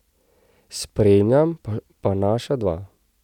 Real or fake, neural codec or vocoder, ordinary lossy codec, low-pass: real; none; none; 19.8 kHz